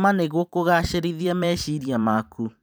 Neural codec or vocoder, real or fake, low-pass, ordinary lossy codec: vocoder, 44.1 kHz, 128 mel bands every 512 samples, BigVGAN v2; fake; none; none